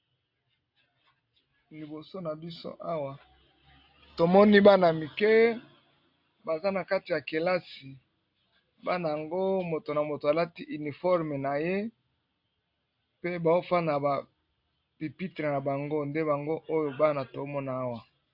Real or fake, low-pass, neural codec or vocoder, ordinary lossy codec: real; 5.4 kHz; none; AAC, 48 kbps